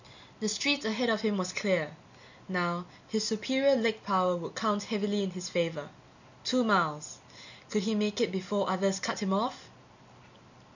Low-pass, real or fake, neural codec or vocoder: 7.2 kHz; real; none